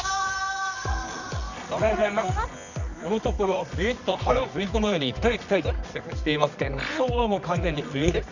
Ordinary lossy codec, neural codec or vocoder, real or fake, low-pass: none; codec, 24 kHz, 0.9 kbps, WavTokenizer, medium music audio release; fake; 7.2 kHz